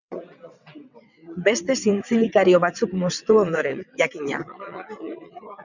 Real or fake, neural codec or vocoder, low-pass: fake; vocoder, 22.05 kHz, 80 mel bands, WaveNeXt; 7.2 kHz